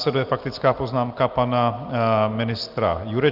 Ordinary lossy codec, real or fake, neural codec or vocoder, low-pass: Opus, 32 kbps; real; none; 5.4 kHz